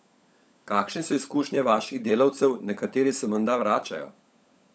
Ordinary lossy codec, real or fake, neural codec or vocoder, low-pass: none; fake; codec, 16 kHz, 16 kbps, FunCodec, trained on LibriTTS, 50 frames a second; none